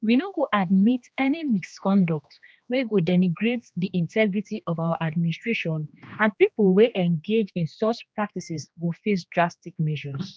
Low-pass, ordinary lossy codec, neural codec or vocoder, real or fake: none; none; codec, 16 kHz, 2 kbps, X-Codec, HuBERT features, trained on general audio; fake